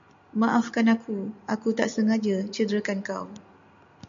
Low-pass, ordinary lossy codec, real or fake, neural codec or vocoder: 7.2 kHz; MP3, 64 kbps; real; none